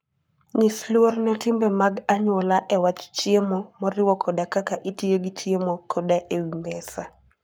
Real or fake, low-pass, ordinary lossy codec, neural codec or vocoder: fake; none; none; codec, 44.1 kHz, 7.8 kbps, Pupu-Codec